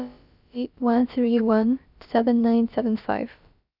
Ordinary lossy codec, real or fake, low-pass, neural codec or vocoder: none; fake; 5.4 kHz; codec, 16 kHz, about 1 kbps, DyCAST, with the encoder's durations